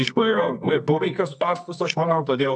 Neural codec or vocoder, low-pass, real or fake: codec, 24 kHz, 0.9 kbps, WavTokenizer, medium music audio release; 10.8 kHz; fake